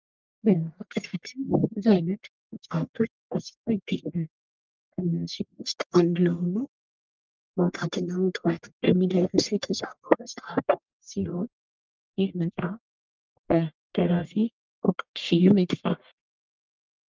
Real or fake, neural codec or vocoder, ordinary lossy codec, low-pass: fake; codec, 44.1 kHz, 1.7 kbps, Pupu-Codec; Opus, 32 kbps; 7.2 kHz